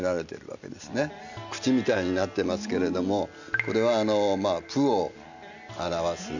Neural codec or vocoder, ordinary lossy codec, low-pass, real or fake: none; none; 7.2 kHz; real